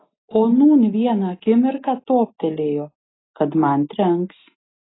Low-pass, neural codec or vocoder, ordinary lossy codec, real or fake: 7.2 kHz; none; AAC, 16 kbps; real